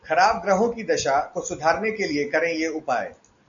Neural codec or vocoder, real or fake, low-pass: none; real; 7.2 kHz